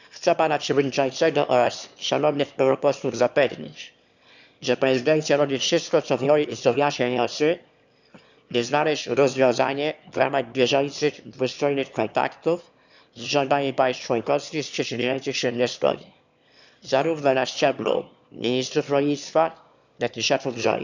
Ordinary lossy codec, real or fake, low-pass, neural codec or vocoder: none; fake; 7.2 kHz; autoencoder, 22.05 kHz, a latent of 192 numbers a frame, VITS, trained on one speaker